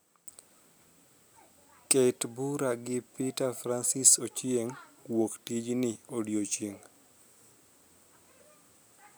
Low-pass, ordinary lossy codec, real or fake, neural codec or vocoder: none; none; real; none